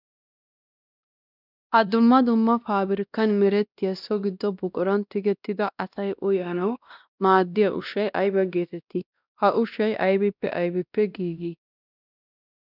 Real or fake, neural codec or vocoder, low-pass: fake; codec, 16 kHz, 1 kbps, X-Codec, WavLM features, trained on Multilingual LibriSpeech; 5.4 kHz